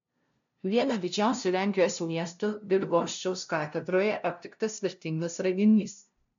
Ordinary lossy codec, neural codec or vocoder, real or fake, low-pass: MP3, 64 kbps; codec, 16 kHz, 0.5 kbps, FunCodec, trained on LibriTTS, 25 frames a second; fake; 7.2 kHz